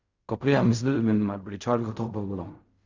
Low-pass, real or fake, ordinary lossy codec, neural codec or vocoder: 7.2 kHz; fake; Opus, 64 kbps; codec, 16 kHz in and 24 kHz out, 0.4 kbps, LongCat-Audio-Codec, fine tuned four codebook decoder